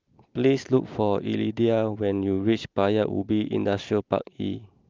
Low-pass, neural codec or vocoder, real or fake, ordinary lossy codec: 7.2 kHz; none; real; Opus, 24 kbps